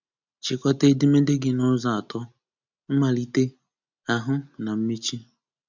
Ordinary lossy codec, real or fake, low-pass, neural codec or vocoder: none; real; 7.2 kHz; none